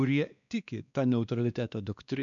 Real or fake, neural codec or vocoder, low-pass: fake; codec, 16 kHz, 2 kbps, X-Codec, WavLM features, trained on Multilingual LibriSpeech; 7.2 kHz